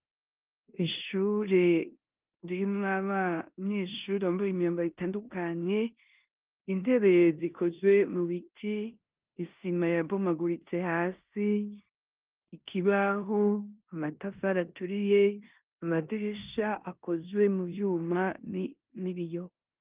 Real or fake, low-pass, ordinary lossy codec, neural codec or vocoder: fake; 3.6 kHz; Opus, 32 kbps; codec, 16 kHz in and 24 kHz out, 0.9 kbps, LongCat-Audio-Codec, four codebook decoder